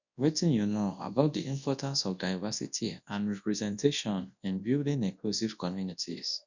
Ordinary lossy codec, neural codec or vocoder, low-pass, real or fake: none; codec, 24 kHz, 0.9 kbps, WavTokenizer, large speech release; 7.2 kHz; fake